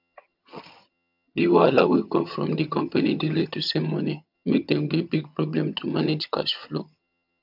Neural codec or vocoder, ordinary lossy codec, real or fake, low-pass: vocoder, 22.05 kHz, 80 mel bands, HiFi-GAN; MP3, 48 kbps; fake; 5.4 kHz